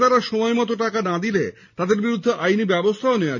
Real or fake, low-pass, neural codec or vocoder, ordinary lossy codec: real; 7.2 kHz; none; none